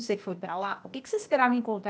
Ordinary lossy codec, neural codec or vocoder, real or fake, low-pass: none; codec, 16 kHz, 0.8 kbps, ZipCodec; fake; none